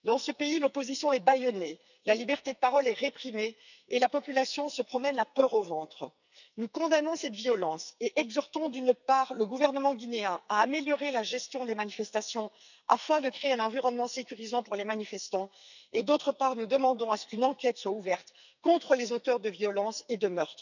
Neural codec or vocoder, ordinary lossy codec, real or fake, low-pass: codec, 44.1 kHz, 2.6 kbps, SNAC; none; fake; 7.2 kHz